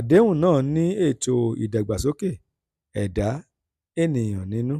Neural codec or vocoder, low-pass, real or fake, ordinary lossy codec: none; 14.4 kHz; real; none